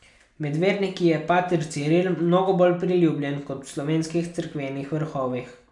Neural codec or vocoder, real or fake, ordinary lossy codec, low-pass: none; real; none; 10.8 kHz